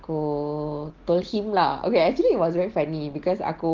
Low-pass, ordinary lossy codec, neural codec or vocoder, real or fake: 7.2 kHz; Opus, 16 kbps; none; real